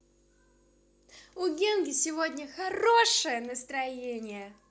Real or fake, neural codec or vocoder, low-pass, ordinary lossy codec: real; none; none; none